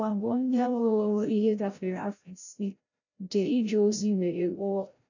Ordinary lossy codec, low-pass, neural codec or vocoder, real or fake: none; 7.2 kHz; codec, 16 kHz, 0.5 kbps, FreqCodec, larger model; fake